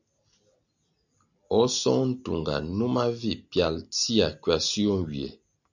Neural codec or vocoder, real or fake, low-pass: none; real; 7.2 kHz